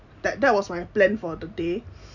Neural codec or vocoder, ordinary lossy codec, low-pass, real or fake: none; none; 7.2 kHz; real